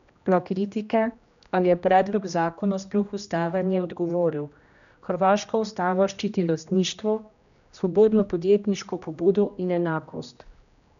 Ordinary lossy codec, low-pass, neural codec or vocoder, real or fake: none; 7.2 kHz; codec, 16 kHz, 1 kbps, X-Codec, HuBERT features, trained on general audio; fake